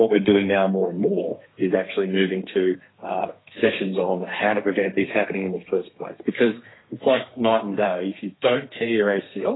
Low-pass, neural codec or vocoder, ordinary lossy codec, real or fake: 7.2 kHz; codec, 32 kHz, 1.9 kbps, SNAC; AAC, 16 kbps; fake